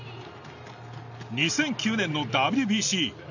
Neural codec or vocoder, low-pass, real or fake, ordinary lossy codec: none; 7.2 kHz; real; none